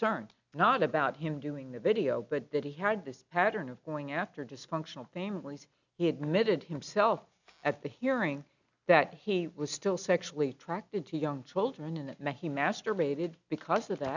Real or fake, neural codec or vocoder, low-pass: real; none; 7.2 kHz